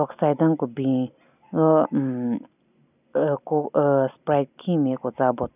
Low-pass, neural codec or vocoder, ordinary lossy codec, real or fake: 3.6 kHz; none; none; real